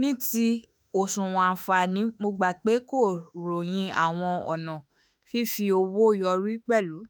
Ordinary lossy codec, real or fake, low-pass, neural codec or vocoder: none; fake; none; autoencoder, 48 kHz, 32 numbers a frame, DAC-VAE, trained on Japanese speech